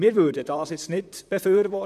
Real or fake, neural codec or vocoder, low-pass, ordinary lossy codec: fake; vocoder, 44.1 kHz, 128 mel bands, Pupu-Vocoder; 14.4 kHz; none